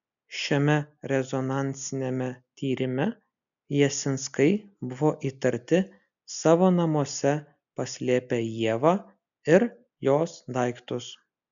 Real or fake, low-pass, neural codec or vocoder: real; 7.2 kHz; none